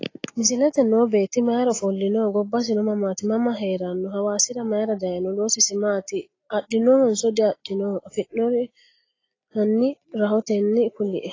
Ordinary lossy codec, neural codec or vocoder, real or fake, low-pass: AAC, 32 kbps; none; real; 7.2 kHz